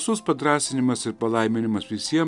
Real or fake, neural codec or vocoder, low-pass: real; none; 10.8 kHz